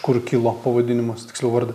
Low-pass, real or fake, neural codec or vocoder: 14.4 kHz; real; none